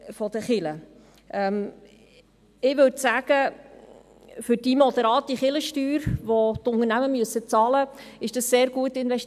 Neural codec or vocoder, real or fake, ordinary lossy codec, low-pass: none; real; none; 14.4 kHz